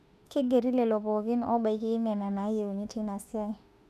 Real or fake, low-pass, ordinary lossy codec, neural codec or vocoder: fake; 14.4 kHz; none; autoencoder, 48 kHz, 32 numbers a frame, DAC-VAE, trained on Japanese speech